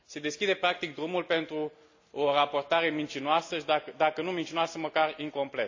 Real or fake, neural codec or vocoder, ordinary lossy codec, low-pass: real; none; AAC, 48 kbps; 7.2 kHz